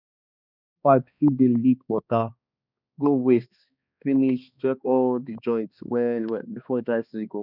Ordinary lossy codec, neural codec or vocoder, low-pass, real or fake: none; codec, 16 kHz, 2 kbps, X-Codec, HuBERT features, trained on balanced general audio; 5.4 kHz; fake